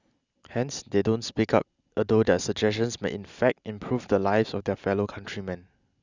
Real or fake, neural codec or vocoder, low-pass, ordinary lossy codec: real; none; 7.2 kHz; Opus, 64 kbps